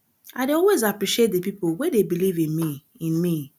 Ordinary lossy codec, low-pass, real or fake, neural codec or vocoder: none; 19.8 kHz; real; none